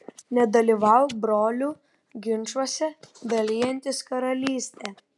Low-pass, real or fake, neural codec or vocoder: 10.8 kHz; real; none